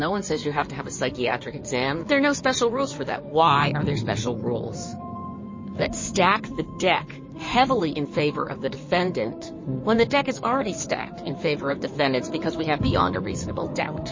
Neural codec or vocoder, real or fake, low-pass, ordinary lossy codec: codec, 16 kHz in and 24 kHz out, 2.2 kbps, FireRedTTS-2 codec; fake; 7.2 kHz; MP3, 32 kbps